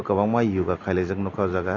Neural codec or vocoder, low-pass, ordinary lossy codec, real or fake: none; 7.2 kHz; AAC, 32 kbps; real